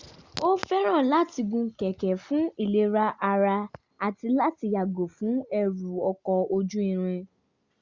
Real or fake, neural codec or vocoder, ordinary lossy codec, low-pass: real; none; Opus, 64 kbps; 7.2 kHz